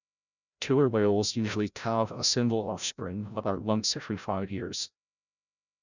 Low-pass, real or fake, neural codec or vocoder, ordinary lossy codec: 7.2 kHz; fake; codec, 16 kHz, 0.5 kbps, FreqCodec, larger model; none